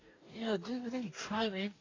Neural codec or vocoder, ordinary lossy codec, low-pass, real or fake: codec, 44.1 kHz, 2.6 kbps, DAC; AAC, 32 kbps; 7.2 kHz; fake